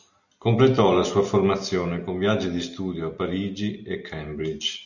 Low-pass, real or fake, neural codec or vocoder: 7.2 kHz; real; none